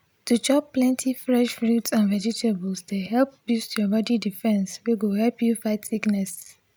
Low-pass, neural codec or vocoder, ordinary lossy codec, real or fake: none; none; none; real